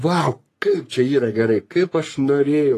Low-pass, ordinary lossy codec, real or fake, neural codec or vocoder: 14.4 kHz; AAC, 48 kbps; fake; codec, 44.1 kHz, 3.4 kbps, Pupu-Codec